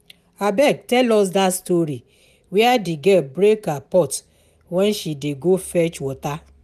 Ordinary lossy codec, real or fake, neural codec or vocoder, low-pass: none; real; none; 14.4 kHz